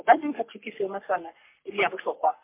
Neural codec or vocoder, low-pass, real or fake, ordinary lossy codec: codec, 44.1 kHz, 3.4 kbps, Pupu-Codec; 3.6 kHz; fake; MP3, 32 kbps